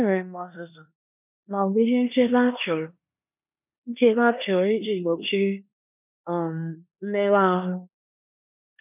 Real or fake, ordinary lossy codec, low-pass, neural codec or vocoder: fake; none; 3.6 kHz; codec, 16 kHz in and 24 kHz out, 0.9 kbps, LongCat-Audio-Codec, four codebook decoder